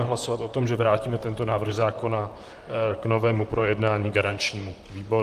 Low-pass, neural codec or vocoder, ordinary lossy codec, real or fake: 14.4 kHz; vocoder, 44.1 kHz, 128 mel bands, Pupu-Vocoder; Opus, 32 kbps; fake